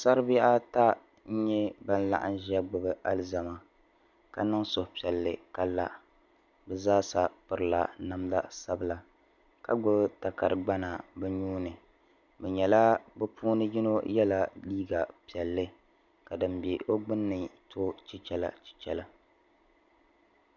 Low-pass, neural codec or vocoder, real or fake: 7.2 kHz; none; real